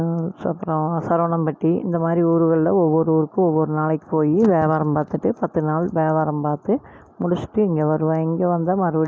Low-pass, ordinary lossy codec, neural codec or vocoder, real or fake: none; none; none; real